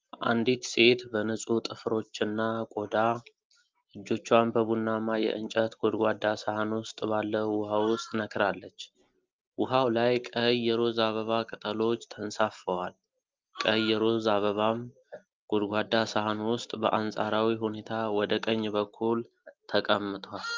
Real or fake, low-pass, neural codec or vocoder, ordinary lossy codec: real; 7.2 kHz; none; Opus, 32 kbps